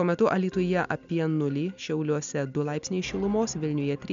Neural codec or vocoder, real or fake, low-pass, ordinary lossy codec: none; real; 7.2 kHz; MP3, 64 kbps